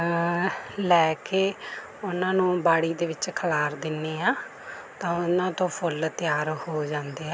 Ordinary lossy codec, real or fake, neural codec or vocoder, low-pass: none; real; none; none